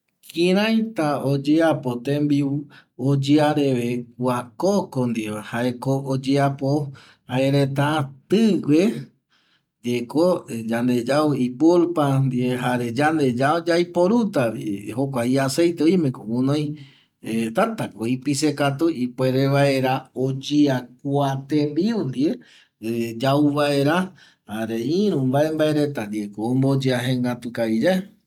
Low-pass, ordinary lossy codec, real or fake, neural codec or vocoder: 19.8 kHz; none; real; none